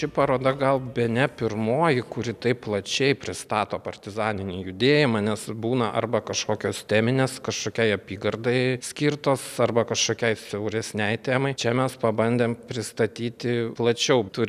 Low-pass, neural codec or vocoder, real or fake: 14.4 kHz; none; real